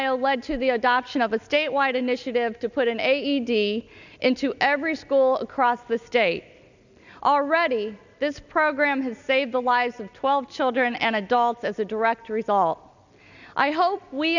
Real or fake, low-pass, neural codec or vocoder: real; 7.2 kHz; none